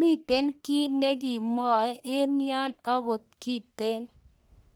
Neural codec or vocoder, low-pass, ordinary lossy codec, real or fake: codec, 44.1 kHz, 1.7 kbps, Pupu-Codec; none; none; fake